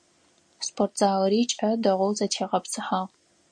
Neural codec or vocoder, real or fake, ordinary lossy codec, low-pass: none; real; MP3, 48 kbps; 9.9 kHz